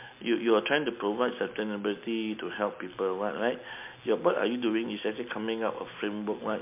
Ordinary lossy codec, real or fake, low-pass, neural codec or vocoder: MP3, 32 kbps; real; 3.6 kHz; none